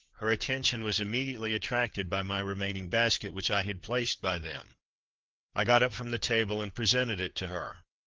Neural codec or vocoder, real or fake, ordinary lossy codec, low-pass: codec, 16 kHz, 4 kbps, FreqCodec, larger model; fake; Opus, 16 kbps; 7.2 kHz